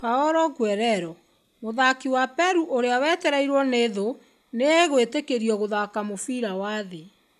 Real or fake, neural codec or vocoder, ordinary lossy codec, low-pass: real; none; none; 14.4 kHz